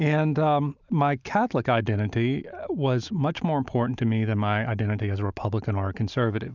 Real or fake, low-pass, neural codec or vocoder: real; 7.2 kHz; none